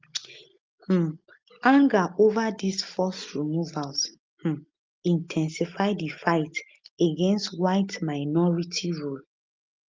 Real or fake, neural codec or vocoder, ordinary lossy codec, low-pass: real; none; Opus, 32 kbps; 7.2 kHz